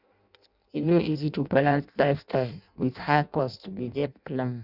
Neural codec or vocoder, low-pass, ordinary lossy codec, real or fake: codec, 16 kHz in and 24 kHz out, 0.6 kbps, FireRedTTS-2 codec; 5.4 kHz; none; fake